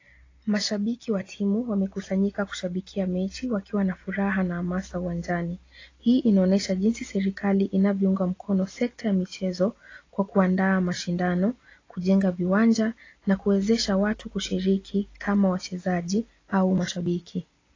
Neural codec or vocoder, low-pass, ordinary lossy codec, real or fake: none; 7.2 kHz; AAC, 32 kbps; real